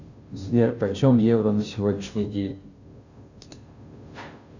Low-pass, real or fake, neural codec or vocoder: 7.2 kHz; fake; codec, 16 kHz, 0.5 kbps, FunCodec, trained on Chinese and English, 25 frames a second